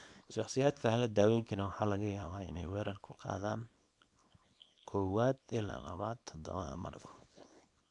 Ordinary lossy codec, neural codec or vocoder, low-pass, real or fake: none; codec, 24 kHz, 0.9 kbps, WavTokenizer, small release; 10.8 kHz; fake